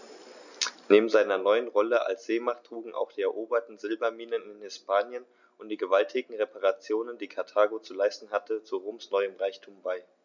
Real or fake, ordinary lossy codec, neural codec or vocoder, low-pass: real; none; none; 7.2 kHz